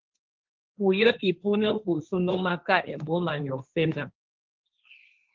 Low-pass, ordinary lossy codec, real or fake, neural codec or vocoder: 7.2 kHz; Opus, 24 kbps; fake; codec, 16 kHz, 1.1 kbps, Voila-Tokenizer